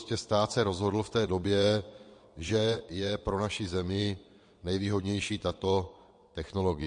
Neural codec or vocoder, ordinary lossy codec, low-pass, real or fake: vocoder, 22.05 kHz, 80 mel bands, WaveNeXt; MP3, 48 kbps; 9.9 kHz; fake